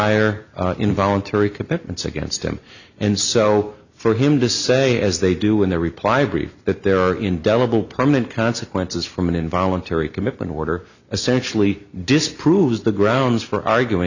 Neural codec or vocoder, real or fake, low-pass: none; real; 7.2 kHz